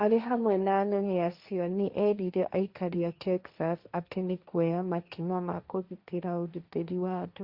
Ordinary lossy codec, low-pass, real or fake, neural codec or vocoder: Opus, 64 kbps; 5.4 kHz; fake; codec, 16 kHz, 1.1 kbps, Voila-Tokenizer